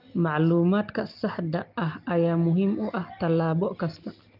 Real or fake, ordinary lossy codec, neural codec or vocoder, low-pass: real; Opus, 32 kbps; none; 5.4 kHz